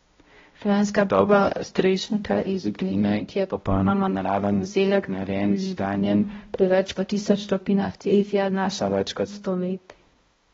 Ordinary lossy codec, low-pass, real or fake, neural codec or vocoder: AAC, 24 kbps; 7.2 kHz; fake; codec, 16 kHz, 0.5 kbps, X-Codec, HuBERT features, trained on balanced general audio